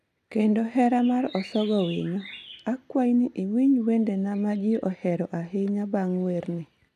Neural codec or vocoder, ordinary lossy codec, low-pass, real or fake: none; none; 14.4 kHz; real